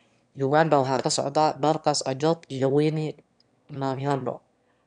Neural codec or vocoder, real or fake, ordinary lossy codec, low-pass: autoencoder, 22.05 kHz, a latent of 192 numbers a frame, VITS, trained on one speaker; fake; none; 9.9 kHz